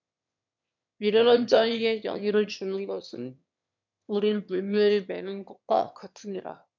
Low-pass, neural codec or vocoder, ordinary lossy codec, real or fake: 7.2 kHz; autoencoder, 22.05 kHz, a latent of 192 numbers a frame, VITS, trained on one speaker; MP3, 64 kbps; fake